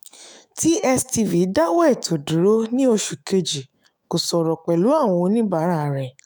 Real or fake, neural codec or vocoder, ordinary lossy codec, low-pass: fake; autoencoder, 48 kHz, 128 numbers a frame, DAC-VAE, trained on Japanese speech; none; none